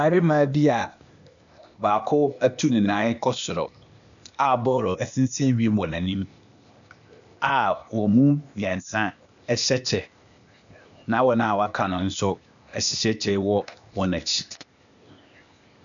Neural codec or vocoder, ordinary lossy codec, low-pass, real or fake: codec, 16 kHz, 0.8 kbps, ZipCodec; MP3, 96 kbps; 7.2 kHz; fake